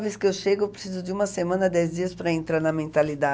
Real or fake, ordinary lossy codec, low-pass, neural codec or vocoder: real; none; none; none